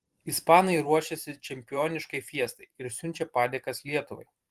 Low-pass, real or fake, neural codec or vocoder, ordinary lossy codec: 14.4 kHz; fake; vocoder, 44.1 kHz, 128 mel bands every 512 samples, BigVGAN v2; Opus, 24 kbps